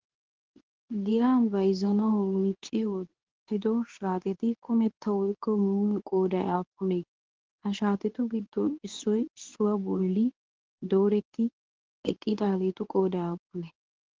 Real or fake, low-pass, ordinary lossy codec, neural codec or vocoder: fake; 7.2 kHz; Opus, 16 kbps; codec, 24 kHz, 0.9 kbps, WavTokenizer, medium speech release version 2